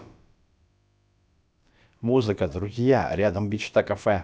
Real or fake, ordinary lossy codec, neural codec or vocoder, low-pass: fake; none; codec, 16 kHz, about 1 kbps, DyCAST, with the encoder's durations; none